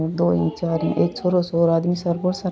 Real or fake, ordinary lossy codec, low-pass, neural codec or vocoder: real; none; none; none